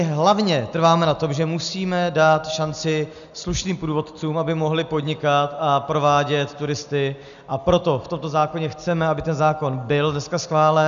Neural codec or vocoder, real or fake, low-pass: none; real; 7.2 kHz